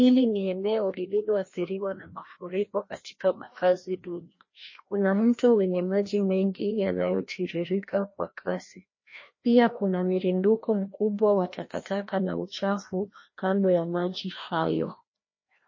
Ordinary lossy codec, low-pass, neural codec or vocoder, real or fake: MP3, 32 kbps; 7.2 kHz; codec, 16 kHz, 1 kbps, FreqCodec, larger model; fake